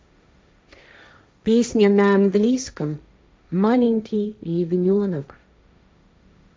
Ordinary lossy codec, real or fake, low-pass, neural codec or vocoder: none; fake; none; codec, 16 kHz, 1.1 kbps, Voila-Tokenizer